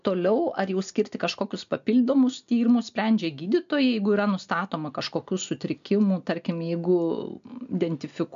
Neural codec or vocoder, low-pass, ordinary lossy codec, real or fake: none; 7.2 kHz; MP3, 64 kbps; real